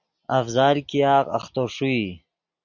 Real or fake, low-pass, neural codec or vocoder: real; 7.2 kHz; none